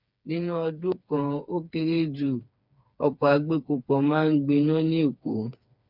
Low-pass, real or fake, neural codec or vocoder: 5.4 kHz; fake; codec, 16 kHz, 4 kbps, FreqCodec, smaller model